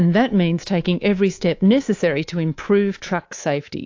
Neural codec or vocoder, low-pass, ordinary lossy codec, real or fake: codec, 24 kHz, 3.1 kbps, DualCodec; 7.2 kHz; AAC, 48 kbps; fake